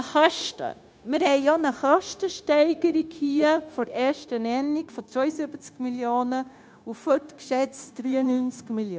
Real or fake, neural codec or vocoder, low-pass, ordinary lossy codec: fake; codec, 16 kHz, 0.9 kbps, LongCat-Audio-Codec; none; none